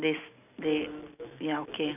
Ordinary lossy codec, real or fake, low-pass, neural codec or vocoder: none; real; 3.6 kHz; none